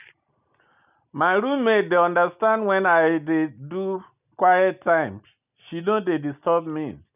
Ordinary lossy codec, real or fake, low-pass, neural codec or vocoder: none; real; 3.6 kHz; none